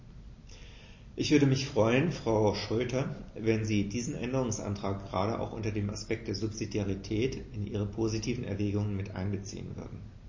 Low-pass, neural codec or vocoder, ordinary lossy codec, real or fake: 7.2 kHz; none; MP3, 32 kbps; real